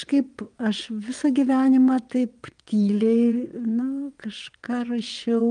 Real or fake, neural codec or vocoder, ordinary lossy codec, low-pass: fake; vocoder, 22.05 kHz, 80 mel bands, WaveNeXt; Opus, 32 kbps; 9.9 kHz